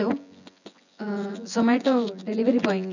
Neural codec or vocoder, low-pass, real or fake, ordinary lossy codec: vocoder, 24 kHz, 100 mel bands, Vocos; 7.2 kHz; fake; none